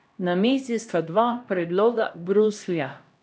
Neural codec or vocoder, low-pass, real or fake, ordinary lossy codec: codec, 16 kHz, 1 kbps, X-Codec, HuBERT features, trained on LibriSpeech; none; fake; none